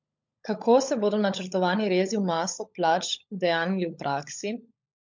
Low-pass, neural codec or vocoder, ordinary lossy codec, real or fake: 7.2 kHz; codec, 16 kHz, 16 kbps, FunCodec, trained on LibriTTS, 50 frames a second; MP3, 48 kbps; fake